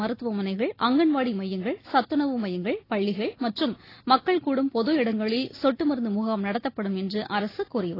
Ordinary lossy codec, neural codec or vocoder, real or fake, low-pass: AAC, 24 kbps; none; real; 5.4 kHz